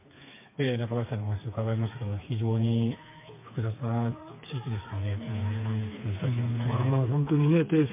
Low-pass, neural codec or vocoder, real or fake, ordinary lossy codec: 3.6 kHz; codec, 16 kHz, 4 kbps, FreqCodec, smaller model; fake; MP3, 24 kbps